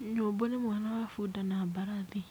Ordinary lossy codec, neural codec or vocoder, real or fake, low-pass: none; none; real; none